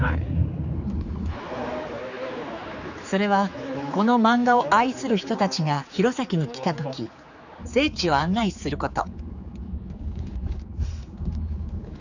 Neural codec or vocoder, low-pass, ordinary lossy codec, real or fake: codec, 16 kHz, 4 kbps, X-Codec, HuBERT features, trained on general audio; 7.2 kHz; none; fake